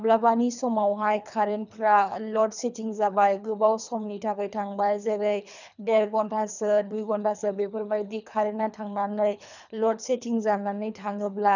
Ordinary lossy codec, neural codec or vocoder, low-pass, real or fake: none; codec, 24 kHz, 3 kbps, HILCodec; 7.2 kHz; fake